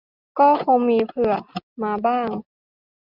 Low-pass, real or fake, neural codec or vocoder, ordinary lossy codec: 5.4 kHz; real; none; Opus, 64 kbps